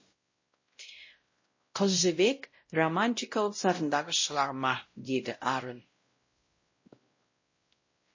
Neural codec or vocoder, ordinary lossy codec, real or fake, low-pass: codec, 16 kHz, 0.5 kbps, X-Codec, WavLM features, trained on Multilingual LibriSpeech; MP3, 32 kbps; fake; 7.2 kHz